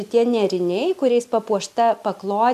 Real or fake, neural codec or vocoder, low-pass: real; none; 14.4 kHz